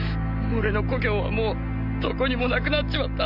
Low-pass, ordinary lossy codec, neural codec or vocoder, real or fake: 5.4 kHz; none; none; real